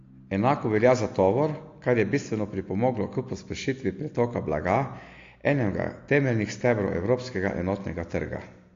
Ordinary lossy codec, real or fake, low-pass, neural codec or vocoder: AAC, 48 kbps; real; 7.2 kHz; none